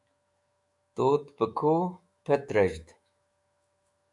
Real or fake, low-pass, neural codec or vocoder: fake; 10.8 kHz; autoencoder, 48 kHz, 128 numbers a frame, DAC-VAE, trained on Japanese speech